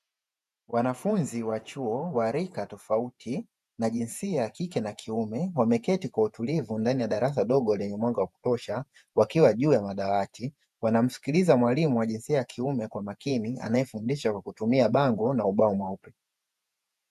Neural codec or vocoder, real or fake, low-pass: vocoder, 48 kHz, 128 mel bands, Vocos; fake; 14.4 kHz